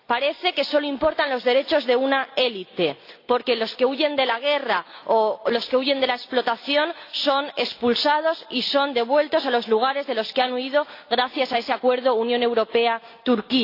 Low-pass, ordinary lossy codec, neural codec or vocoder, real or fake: 5.4 kHz; AAC, 32 kbps; none; real